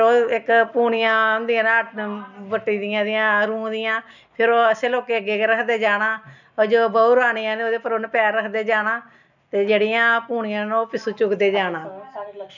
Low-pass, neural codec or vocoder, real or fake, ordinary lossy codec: 7.2 kHz; none; real; none